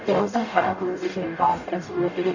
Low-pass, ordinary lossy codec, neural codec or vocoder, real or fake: 7.2 kHz; none; codec, 44.1 kHz, 0.9 kbps, DAC; fake